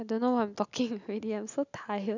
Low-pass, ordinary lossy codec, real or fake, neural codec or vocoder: 7.2 kHz; none; real; none